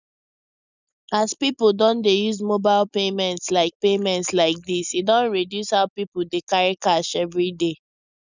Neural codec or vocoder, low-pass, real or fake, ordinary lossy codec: none; 7.2 kHz; real; none